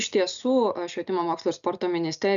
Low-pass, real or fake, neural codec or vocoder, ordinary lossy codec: 7.2 kHz; real; none; MP3, 96 kbps